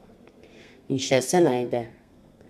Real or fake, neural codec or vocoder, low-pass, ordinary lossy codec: fake; codec, 32 kHz, 1.9 kbps, SNAC; 14.4 kHz; none